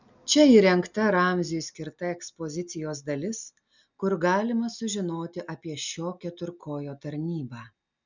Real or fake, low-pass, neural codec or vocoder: real; 7.2 kHz; none